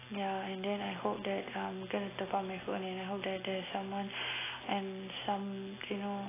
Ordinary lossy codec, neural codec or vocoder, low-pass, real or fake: AAC, 16 kbps; none; 3.6 kHz; real